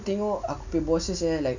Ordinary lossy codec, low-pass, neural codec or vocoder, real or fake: none; 7.2 kHz; none; real